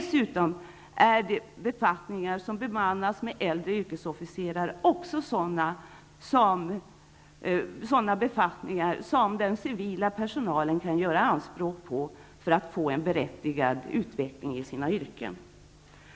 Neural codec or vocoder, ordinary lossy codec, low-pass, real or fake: none; none; none; real